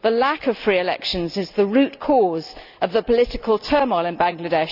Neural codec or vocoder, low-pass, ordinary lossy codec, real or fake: none; 5.4 kHz; none; real